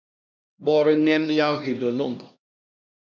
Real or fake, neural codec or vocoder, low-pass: fake; codec, 16 kHz, 1 kbps, X-Codec, HuBERT features, trained on LibriSpeech; 7.2 kHz